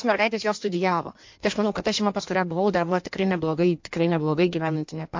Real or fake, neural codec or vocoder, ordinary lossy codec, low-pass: fake; codec, 16 kHz in and 24 kHz out, 1.1 kbps, FireRedTTS-2 codec; MP3, 48 kbps; 7.2 kHz